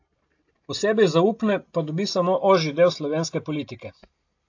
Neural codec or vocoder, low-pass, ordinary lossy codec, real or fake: none; 7.2 kHz; AAC, 48 kbps; real